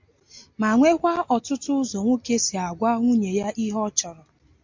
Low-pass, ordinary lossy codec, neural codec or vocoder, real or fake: 7.2 kHz; MP3, 48 kbps; none; real